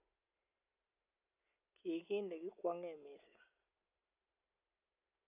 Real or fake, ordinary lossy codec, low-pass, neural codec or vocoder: real; none; 3.6 kHz; none